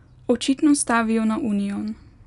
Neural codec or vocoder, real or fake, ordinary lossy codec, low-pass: none; real; none; 10.8 kHz